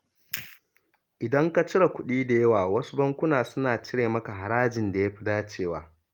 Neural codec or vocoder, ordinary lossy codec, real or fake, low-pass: none; Opus, 32 kbps; real; 19.8 kHz